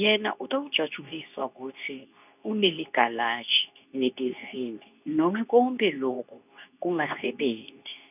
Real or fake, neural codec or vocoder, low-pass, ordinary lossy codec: fake; codec, 24 kHz, 0.9 kbps, WavTokenizer, medium speech release version 2; 3.6 kHz; none